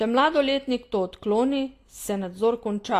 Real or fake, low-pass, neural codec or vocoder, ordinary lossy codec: real; 14.4 kHz; none; AAC, 48 kbps